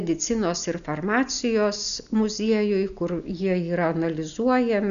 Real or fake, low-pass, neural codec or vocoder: real; 7.2 kHz; none